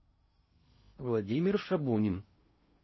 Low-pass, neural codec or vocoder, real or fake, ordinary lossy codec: 7.2 kHz; codec, 16 kHz in and 24 kHz out, 0.8 kbps, FocalCodec, streaming, 65536 codes; fake; MP3, 24 kbps